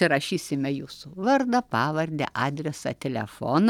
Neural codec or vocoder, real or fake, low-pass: none; real; 19.8 kHz